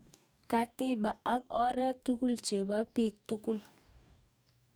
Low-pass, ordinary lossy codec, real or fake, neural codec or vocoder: none; none; fake; codec, 44.1 kHz, 2.6 kbps, DAC